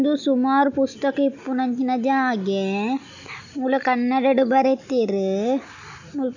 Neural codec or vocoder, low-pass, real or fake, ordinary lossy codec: none; 7.2 kHz; real; none